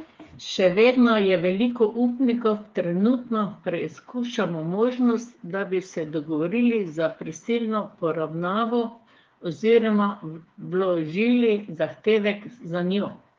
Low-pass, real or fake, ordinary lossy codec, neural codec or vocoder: 7.2 kHz; fake; Opus, 32 kbps; codec, 16 kHz, 4 kbps, FreqCodec, smaller model